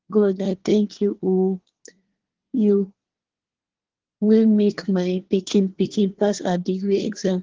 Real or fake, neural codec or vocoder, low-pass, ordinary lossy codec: fake; codec, 24 kHz, 1 kbps, SNAC; 7.2 kHz; Opus, 16 kbps